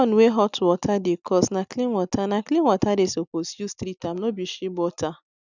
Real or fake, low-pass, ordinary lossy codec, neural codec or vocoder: real; 7.2 kHz; none; none